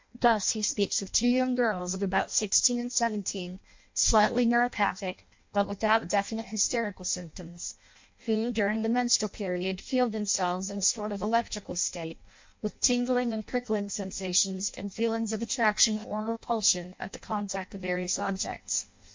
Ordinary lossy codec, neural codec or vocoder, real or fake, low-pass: MP3, 48 kbps; codec, 16 kHz in and 24 kHz out, 0.6 kbps, FireRedTTS-2 codec; fake; 7.2 kHz